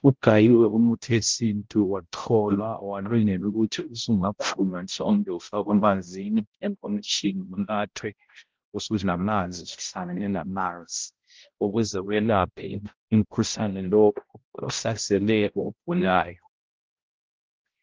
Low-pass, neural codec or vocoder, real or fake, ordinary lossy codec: 7.2 kHz; codec, 16 kHz, 0.5 kbps, X-Codec, HuBERT features, trained on balanced general audio; fake; Opus, 16 kbps